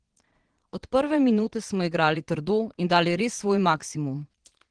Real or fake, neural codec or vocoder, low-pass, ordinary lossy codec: fake; vocoder, 22.05 kHz, 80 mel bands, Vocos; 9.9 kHz; Opus, 16 kbps